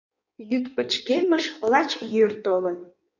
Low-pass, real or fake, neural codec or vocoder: 7.2 kHz; fake; codec, 16 kHz in and 24 kHz out, 1.1 kbps, FireRedTTS-2 codec